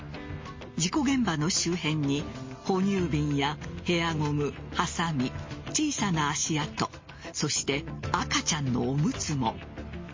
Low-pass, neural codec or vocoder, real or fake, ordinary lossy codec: 7.2 kHz; none; real; MP3, 32 kbps